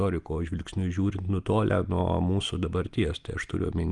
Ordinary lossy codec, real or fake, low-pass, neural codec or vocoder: Opus, 32 kbps; real; 10.8 kHz; none